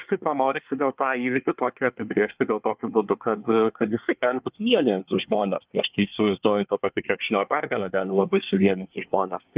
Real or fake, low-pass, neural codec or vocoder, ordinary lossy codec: fake; 3.6 kHz; codec, 24 kHz, 1 kbps, SNAC; Opus, 24 kbps